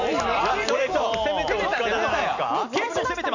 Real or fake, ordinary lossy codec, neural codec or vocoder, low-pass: real; none; none; 7.2 kHz